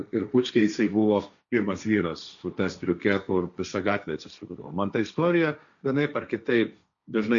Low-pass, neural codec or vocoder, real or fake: 7.2 kHz; codec, 16 kHz, 1.1 kbps, Voila-Tokenizer; fake